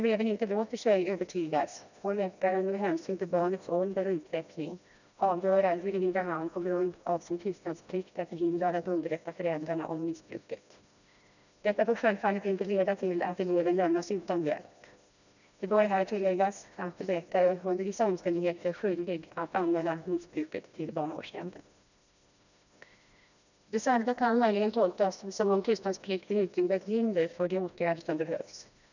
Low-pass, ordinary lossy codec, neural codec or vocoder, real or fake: 7.2 kHz; none; codec, 16 kHz, 1 kbps, FreqCodec, smaller model; fake